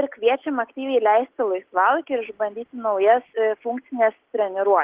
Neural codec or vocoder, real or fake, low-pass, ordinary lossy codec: none; real; 3.6 kHz; Opus, 16 kbps